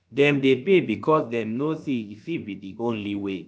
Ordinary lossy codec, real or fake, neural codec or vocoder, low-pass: none; fake; codec, 16 kHz, about 1 kbps, DyCAST, with the encoder's durations; none